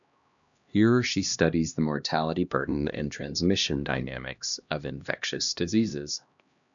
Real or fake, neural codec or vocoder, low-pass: fake; codec, 16 kHz, 1 kbps, X-Codec, HuBERT features, trained on LibriSpeech; 7.2 kHz